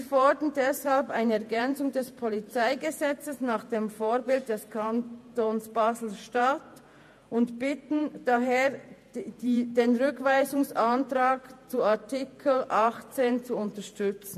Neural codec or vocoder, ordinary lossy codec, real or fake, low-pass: vocoder, 48 kHz, 128 mel bands, Vocos; MP3, 64 kbps; fake; 14.4 kHz